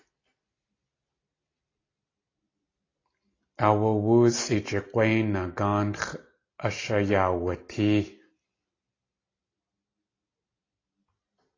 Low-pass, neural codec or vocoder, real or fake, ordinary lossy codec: 7.2 kHz; none; real; AAC, 32 kbps